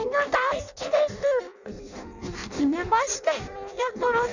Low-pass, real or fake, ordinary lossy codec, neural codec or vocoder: 7.2 kHz; fake; none; codec, 16 kHz in and 24 kHz out, 0.6 kbps, FireRedTTS-2 codec